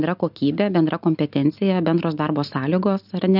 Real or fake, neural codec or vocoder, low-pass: real; none; 5.4 kHz